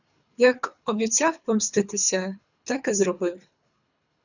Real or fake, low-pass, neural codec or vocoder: fake; 7.2 kHz; codec, 24 kHz, 3 kbps, HILCodec